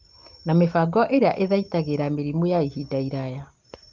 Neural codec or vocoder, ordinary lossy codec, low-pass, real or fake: none; Opus, 32 kbps; 7.2 kHz; real